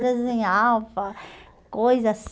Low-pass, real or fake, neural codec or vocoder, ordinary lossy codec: none; real; none; none